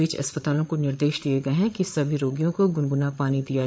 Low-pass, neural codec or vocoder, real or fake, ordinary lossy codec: none; codec, 16 kHz, 8 kbps, FreqCodec, larger model; fake; none